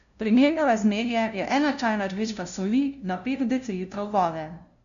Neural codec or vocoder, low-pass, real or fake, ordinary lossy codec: codec, 16 kHz, 0.5 kbps, FunCodec, trained on LibriTTS, 25 frames a second; 7.2 kHz; fake; none